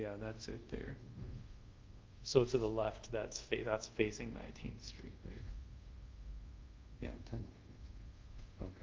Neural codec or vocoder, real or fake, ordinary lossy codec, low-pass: codec, 24 kHz, 0.5 kbps, DualCodec; fake; Opus, 32 kbps; 7.2 kHz